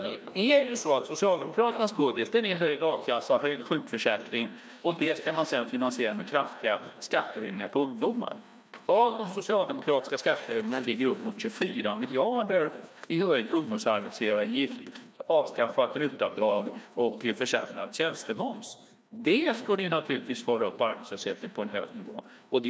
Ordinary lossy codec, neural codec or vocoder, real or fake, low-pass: none; codec, 16 kHz, 1 kbps, FreqCodec, larger model; fake; none